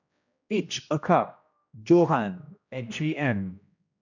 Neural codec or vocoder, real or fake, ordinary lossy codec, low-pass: codec, 16 kHz, 1 kbps, X-Codec, HuBERT features, trained on general audio; fake; none; 7.2 kHz